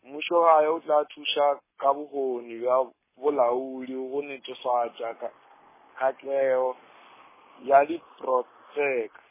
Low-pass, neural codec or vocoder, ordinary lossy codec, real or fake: 3.6 kHz; codec, 44.1 kHz, 7.8 kbps, Pupu-Codec; MP3, 16 kbps; fake